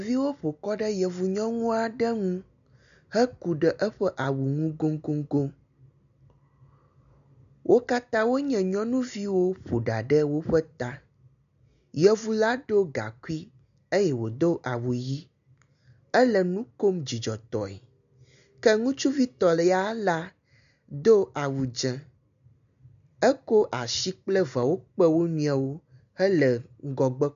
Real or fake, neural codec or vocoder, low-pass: real; none; 7.2 kHz